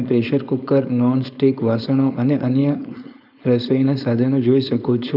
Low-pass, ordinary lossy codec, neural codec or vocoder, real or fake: 5.4 kHz; none; codec, 16 kHz, 4.8 kbps, FACodec; fake